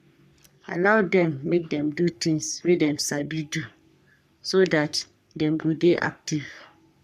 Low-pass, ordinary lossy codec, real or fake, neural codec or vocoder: 14.4 kHz; none; fake; codec, 44.1 kHz, 3.4 kbps, Pupu-Codec